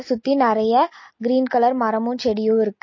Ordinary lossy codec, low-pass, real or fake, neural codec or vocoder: MP3, 32 kbps; 7.2 kHz; real; none